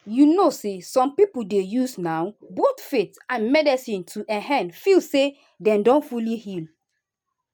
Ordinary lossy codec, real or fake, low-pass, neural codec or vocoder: none; real; none; none